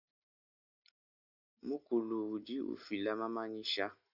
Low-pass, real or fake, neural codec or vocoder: 5.4 kHz; real; none